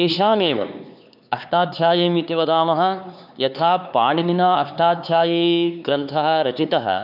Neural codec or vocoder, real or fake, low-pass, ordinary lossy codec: codec, 16 kHz, 4 kbps, X-Codec, HuBERT features, trained on LibriSpeech; fake; 5.4 kHz; none